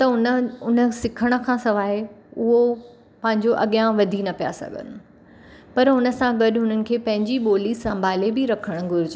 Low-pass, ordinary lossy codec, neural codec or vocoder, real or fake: none; none; none; real